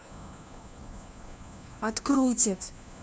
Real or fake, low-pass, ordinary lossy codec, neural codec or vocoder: fake; none; none; codec, 16 kHz, 1 kbps, FunCodec, trained on LibriTTS, 50 frames a second